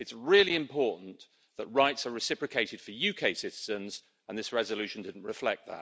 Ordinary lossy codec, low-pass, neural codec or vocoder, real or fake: none; none; none; real